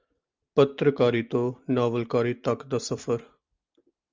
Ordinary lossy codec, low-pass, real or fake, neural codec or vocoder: Opus, 32 kbps; 7.2 kHz; real; none